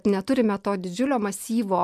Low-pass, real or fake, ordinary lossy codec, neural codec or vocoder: 14.4 kHz; real; MP3, 96 kbps; none